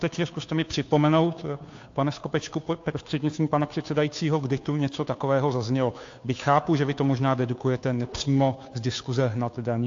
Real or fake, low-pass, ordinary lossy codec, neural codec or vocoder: fake; 7.2 kHz; AAC, 48 kbps; codec, 16 kHz, 2 kbps, FunCodec, trained on Chinese and English, 25 frames a second